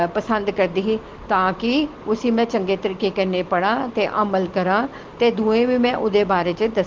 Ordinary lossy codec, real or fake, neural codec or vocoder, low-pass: Opus, 16 kbps; real; none; 7.2 kHz